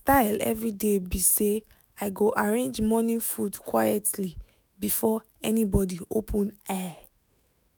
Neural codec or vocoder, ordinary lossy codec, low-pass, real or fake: autoencoder, 48 kHz, 128 numbers a frame, DAC-VAE, trained on Japanese speech; none; none; fake